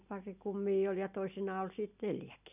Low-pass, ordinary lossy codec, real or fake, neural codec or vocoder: 3.6 kHz; none; real; none